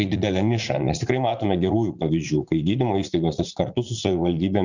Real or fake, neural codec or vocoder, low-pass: fake; vocoder, 44.1 kHz, 80 mel bands, Vocos; 7.2 kHz